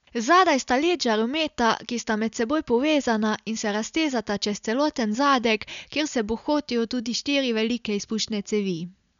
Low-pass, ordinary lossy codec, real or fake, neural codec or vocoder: 7.2 kHz; none; real; none